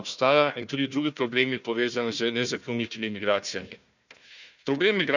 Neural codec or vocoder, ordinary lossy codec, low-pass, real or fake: codec, 16 kHz, 1 kbps, FunCodec, trained on Chinese and English, 50 frames a second; none; 7.2 kHz; fake